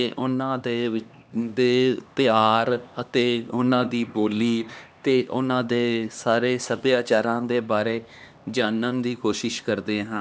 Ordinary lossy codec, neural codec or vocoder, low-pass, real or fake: none; codec, 16 kHz, 2 kbps, X-Codec, HuBERT features, trained on LibriSpeech; none; fake